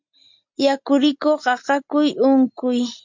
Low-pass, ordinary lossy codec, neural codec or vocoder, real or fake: 7.2 kHz; MP3, 64 kbps; none; real